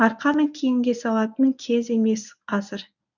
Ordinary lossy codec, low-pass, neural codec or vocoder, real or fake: none; 7.2 kHz; codec, 24 kHz, 0.9 kbps, WavTokenizer, medium speech release version 1; fake